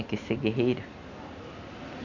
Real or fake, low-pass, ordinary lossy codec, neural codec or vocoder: real; 7.2 kHz; none; none